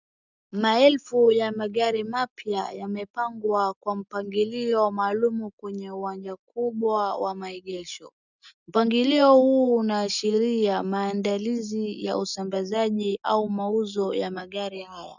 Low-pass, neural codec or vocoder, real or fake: 7.2 kHz; none; real